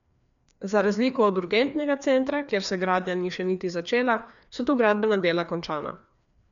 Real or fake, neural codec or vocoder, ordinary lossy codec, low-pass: fake; codec, 16 kHz, 2 kbps, FreqCodec, larger model; none; 7.2 kHz